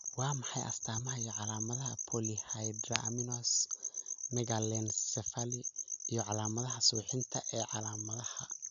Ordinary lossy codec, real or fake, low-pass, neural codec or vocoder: none; real; 7.2 kHz; none